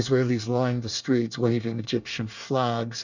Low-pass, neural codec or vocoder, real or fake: 7.2 kHz; codec, 24 kHz, 1 kbps, SNAC; fake